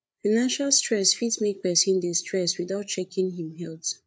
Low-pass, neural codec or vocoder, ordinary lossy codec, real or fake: none; codec, 16 kHz, 8 kbps, FreqCodec, larger model; none; fake